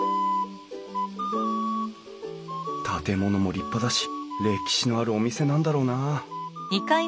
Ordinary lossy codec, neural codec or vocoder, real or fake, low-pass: none; none; real; none